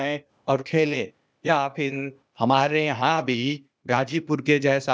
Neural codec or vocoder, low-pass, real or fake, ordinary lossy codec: codec, 16 kHz, 0.8 kbps, ZipCodec; none; fake; none